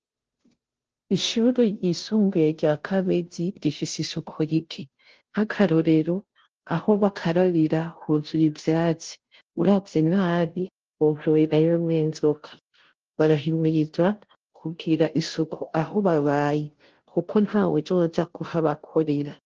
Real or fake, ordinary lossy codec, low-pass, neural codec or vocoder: fake; Opus, 16 kbps; 7.2 kHz; codec, 16 kHz, 0.5 kbps, FunCodec, trained on Chinese and English, 25 frames a second